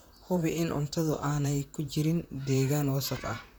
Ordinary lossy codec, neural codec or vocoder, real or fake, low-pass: none; vocoder, 44.1 kHz, 128 mel bands, Pupu-Vocoder; fake; none